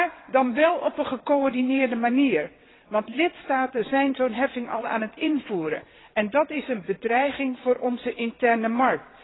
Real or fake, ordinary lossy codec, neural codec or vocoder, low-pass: fake; AAC, 16 kbps; vocoder, 22.05 kHz, 80 mel bands, WaveNeXt; 7.2 kHz